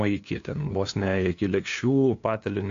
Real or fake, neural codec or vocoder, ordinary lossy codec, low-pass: fake; codec, 16 kHz, 2 kbps, FunCodec, trained on LibriTTS, 25 frames a second; AAC, 64 kbps; 7.2 kHz